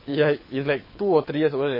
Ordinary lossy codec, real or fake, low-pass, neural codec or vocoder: MP3, 24 kbps; fake; 5.4 kHz; codec, 24 kHz, 6 kbps, HILCodec